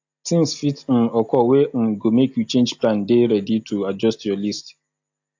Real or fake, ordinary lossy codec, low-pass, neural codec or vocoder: real; AAC, 48 kbps; 7.2 kHz; none